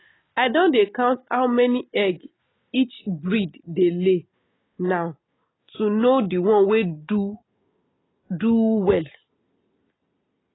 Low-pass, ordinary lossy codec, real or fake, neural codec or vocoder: 7.2 kHz; AAC, 16 kbps; real; none